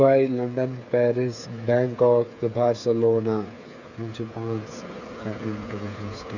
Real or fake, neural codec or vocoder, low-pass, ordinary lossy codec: fake; codec, 16 kHz, 8 kbps, FreqCodec, smaller model; 7.2 kHz; none